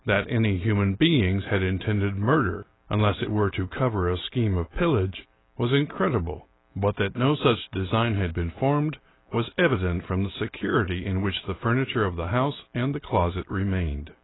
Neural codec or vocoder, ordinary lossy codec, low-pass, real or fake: none; AAC, 16 kbps; 7.2 kHz; real